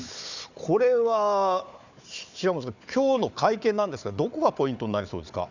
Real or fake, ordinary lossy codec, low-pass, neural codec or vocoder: fake; none; 7.2 kHz; codec, 16 kHz, 4 kbps, FunCodec, trained on Chinese and English, 50 frames a second